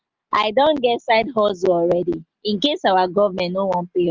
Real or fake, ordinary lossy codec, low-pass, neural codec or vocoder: real; Opus, 24 kbps; 7.2 kHz; none